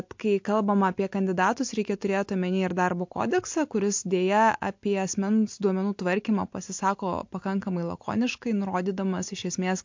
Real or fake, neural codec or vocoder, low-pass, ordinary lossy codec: real; none; 7.2 kHz; MP3, 48 kbps